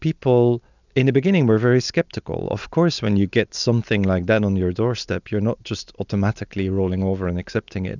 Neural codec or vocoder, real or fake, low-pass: none; real; 7.2 kHz